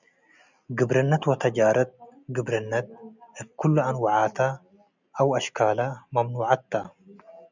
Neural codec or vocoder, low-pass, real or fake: none; 7.2 kHz; real